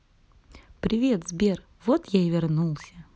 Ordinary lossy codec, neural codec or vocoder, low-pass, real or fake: none; none; none; real